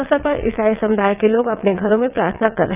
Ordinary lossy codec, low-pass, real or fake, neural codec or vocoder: none; 3.6 kHz; fake; vocoder, 22.05 kHz, 80 mel bands, WaveNeXt